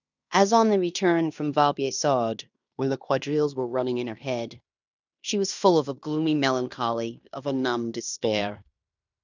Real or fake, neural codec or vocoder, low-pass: fake; codec, 16 kHz in and 24 kHz out, 0.9 kbps, LongCat-Audio-Codec, fine tuned four codebook decoder; 7.2 kHz